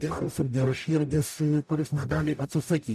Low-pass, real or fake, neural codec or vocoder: 14.4 kHz; fake; codec, 44.1 kHz, 0.9 kbps, DAC